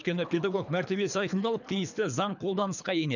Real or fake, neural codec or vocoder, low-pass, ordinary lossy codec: fake; codec, 24 kHz, 3 kbps, HILCodec; 7.2 kHz; none